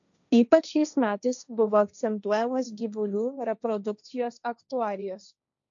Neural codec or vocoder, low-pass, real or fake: codec, 16 kHz, 1.1 kbps, Voila-Tokenizer; 7.2 kHz; fake